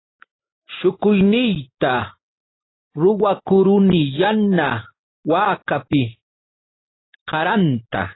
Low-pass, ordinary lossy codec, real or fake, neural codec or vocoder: 7.2 kHz; AAC, 16 kbps; real; none